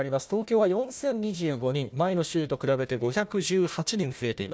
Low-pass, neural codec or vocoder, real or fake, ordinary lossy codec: none; codec, 16 kHz, 1 kbps, FunCodec, trained on Chinese and English, 50 frames a second; fake; none